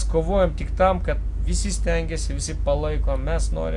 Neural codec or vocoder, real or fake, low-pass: none; real; 10.8 kHz